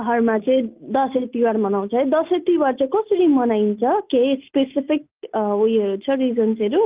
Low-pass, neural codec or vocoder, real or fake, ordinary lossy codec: 3.6 kHz; none; real; Opus, 24 kbps